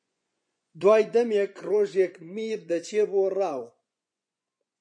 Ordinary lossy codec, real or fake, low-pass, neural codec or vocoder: MP3, 96 kbps; fake; 9.9 kHz; vocoder, 24 kHz, 100 mel bands, Vocos